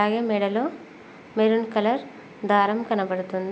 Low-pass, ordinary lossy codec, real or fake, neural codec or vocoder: none; none; real; none